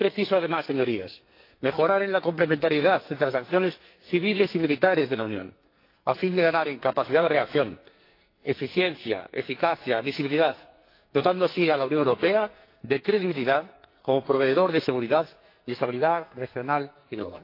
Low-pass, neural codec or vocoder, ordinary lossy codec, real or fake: 5.4 kHz; codec, 44.1 kHz, 2.6 kbps, SNAC; AAC, 32 kbps; fake